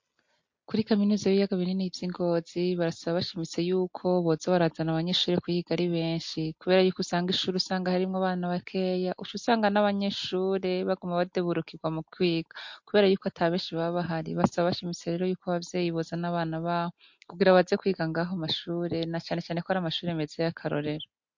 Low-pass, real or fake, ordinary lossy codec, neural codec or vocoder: 7.2 kHz; real; MP3, 48 kbps; none